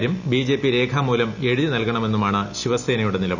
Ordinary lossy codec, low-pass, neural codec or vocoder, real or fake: none; 7.2 kHz; none; real